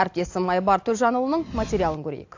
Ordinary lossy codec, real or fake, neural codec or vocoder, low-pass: AAC, 48 kbps; real; none; 7.2 kHz